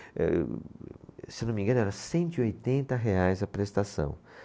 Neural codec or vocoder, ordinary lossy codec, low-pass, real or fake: none; none; none; real